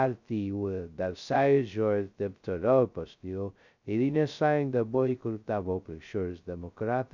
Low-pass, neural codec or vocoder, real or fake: 7.2 kHz; codec, 16 kHz, 0.2 kbps, FocalCodec; fake